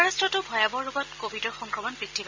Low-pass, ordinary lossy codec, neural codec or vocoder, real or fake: 7.2 kHz; MP3, 64 kbps; none; real